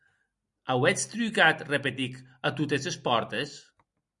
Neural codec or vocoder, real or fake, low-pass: none; real; 10.8 kHz